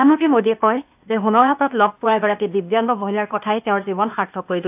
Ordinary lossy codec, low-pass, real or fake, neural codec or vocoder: AAC, 32 kbps; 3.6 kHz; fake; codec, 16 kHz, 0.8 kbps, ZipCodec